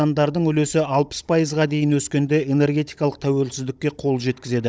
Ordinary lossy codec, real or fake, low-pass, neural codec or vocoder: none; fake; none; codec, 16 kHz, 16 kbps, FunCodec, trained on Chinese and English, 50 frames a second